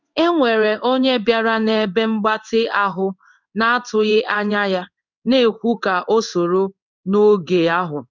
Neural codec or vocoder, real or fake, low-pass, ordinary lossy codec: codec, 16 kHz in and 24 kHz out, 1 kbps, XY-Tokenizer; fake; 7.2 kHz; none